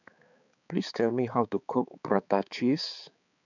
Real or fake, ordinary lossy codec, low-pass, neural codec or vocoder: fake; none; 7.2 kHz; codec, 16 kHz, 4 kbps, X-Codec, HuBERT features, trained on balanced general audio